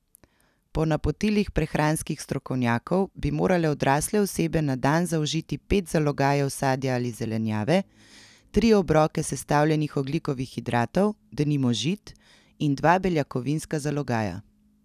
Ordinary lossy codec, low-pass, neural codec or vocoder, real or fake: none; 14.4 kHz; none; real